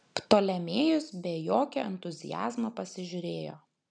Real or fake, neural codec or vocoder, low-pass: real; none; 9.9 kHz